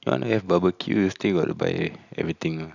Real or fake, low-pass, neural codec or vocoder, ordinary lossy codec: real; 7.2 kHz; none; none